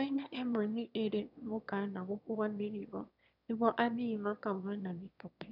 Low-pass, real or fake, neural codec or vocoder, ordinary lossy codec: 5.4 kHz; fake; autoencoder, 22.05 kHz, a latent of 192 numbers a frame, VITS, trained on one speaker; none